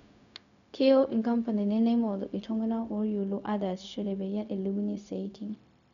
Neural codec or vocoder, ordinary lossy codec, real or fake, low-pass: codec, 16 kHz, 0.4 kbps, LongCat-Audio-Codec; none; fake; 7.2 kHz